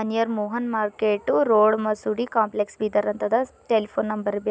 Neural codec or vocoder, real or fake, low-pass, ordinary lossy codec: none; real; none; none